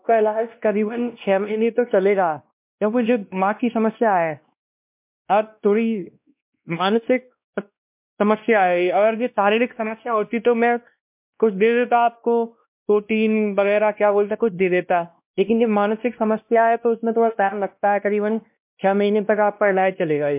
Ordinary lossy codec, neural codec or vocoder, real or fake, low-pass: MP3, 32 kbps; codec, 16 kHz, 1 kbps, X-Codec, WavLM features, trained on Multilingual LibriSpeech; fake; 3.6 kHz